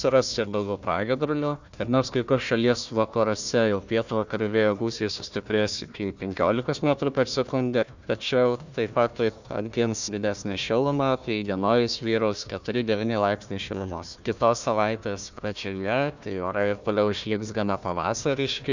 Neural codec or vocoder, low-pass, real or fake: codec, 16 kHz, 1 kbps, FunCodec, trained on Chinese and English, 50 frames a second; 7.2 kHz; fake